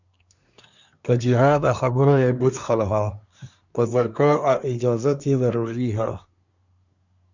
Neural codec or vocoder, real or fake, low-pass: codec, 24 kHz, 1 kbps, SNAC; fake; 7.2 kHz